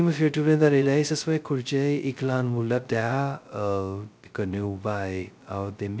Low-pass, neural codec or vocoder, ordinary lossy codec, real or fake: none; codec, 16 kHz, 0.2 kbps, FocalCodec; none; fake